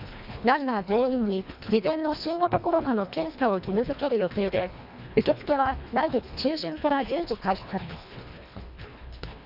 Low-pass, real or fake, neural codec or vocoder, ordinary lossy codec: 5.4 kHz; fake; codec, 24 kHz, 1.5 kbps, HILCodec; none